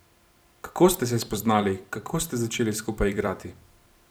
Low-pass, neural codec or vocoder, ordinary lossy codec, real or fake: none; vocoder, 44.1 kHz, 128 mel bands every 256 samples, BigVGAN v2; none; fake